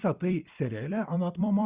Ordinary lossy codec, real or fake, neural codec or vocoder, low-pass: Opus, 64 kbps; fake; vocoder, 44.1 kHz, 128 mel bands every 256 samples, BigVGAN v2; 3.6 kHz